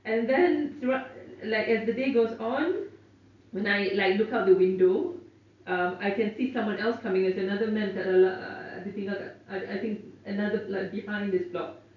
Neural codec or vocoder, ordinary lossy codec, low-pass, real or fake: none; none; 7.2 kHz; real